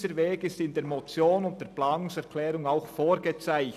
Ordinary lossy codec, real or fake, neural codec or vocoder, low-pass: none; real; none; 14.4 kHz